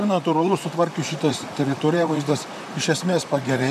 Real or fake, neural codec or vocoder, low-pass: fake; vocoder, 44.1 kHz, 128 mel bands, Pupu-Vocoder; 14.4 kHz